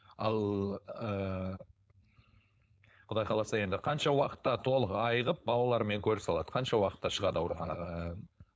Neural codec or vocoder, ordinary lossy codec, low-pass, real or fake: codec, 16 kHz, 4.8 kbps, FACodec; none; none; fake